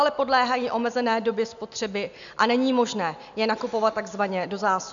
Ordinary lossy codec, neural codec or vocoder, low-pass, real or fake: MP3, 96 kbps; none; 7.2 kHz; real